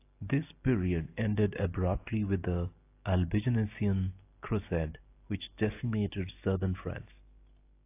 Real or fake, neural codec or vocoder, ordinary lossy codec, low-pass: real; none; AAC, 24 kbps; 3.6 kHz